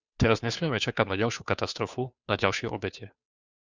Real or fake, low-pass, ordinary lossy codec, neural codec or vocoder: fake; 7.2 kHz; Opus, 64 kbps; codec, 16 kHz, 2 kbps, FunCodec, trained on Chinese and English, 25 frames a second